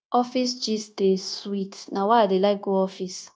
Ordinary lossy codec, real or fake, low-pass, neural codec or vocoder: none; fake; none; codec, 16 kHz, 0.9 kbps, LongCat-Audio-Codec